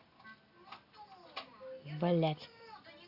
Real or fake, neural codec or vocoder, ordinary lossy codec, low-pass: real; none; Opus, 64 kbps; 5.4 kHz